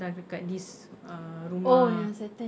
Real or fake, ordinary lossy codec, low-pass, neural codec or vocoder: real; none; none; none